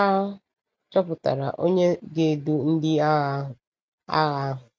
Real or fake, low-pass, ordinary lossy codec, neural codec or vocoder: real; none; none; none